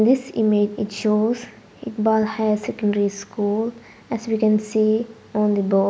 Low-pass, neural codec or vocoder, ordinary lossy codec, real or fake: none; none; none; real